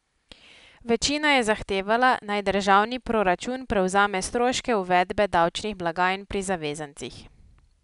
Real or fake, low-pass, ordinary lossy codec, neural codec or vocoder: real; 10.8 kHz; none; none